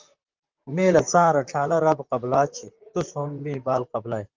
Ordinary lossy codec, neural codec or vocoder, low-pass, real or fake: Opus, 32 kbps; vocoder, 44.1 kHz, 128 mel bands, Pupu-Vocoder; 7.2 kHz; fake